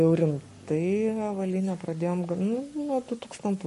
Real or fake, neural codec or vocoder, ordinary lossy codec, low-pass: fake; codec, 44.1 kHz, 7.8 kbps, Pupu-Codec; MP3, 48 kbps; 14.4 kHz